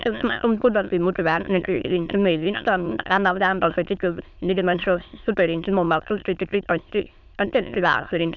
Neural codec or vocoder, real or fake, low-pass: autoencoder, 22.05 kHz, a latent of 192 numbers a frame, VITS, trained on many speakers; fake; 7.2 kHz